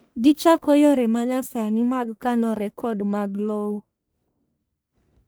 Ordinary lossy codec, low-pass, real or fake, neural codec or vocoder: none; none; fake; codec, 44.1 kHz, 1.7 kbps, Pupu-Codec